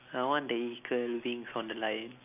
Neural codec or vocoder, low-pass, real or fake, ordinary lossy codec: none; 3.6 kHz; real; none